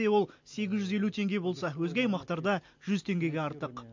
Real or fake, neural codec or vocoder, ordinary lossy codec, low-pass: real; none; MP3, 48 kbps; 7.2 kHz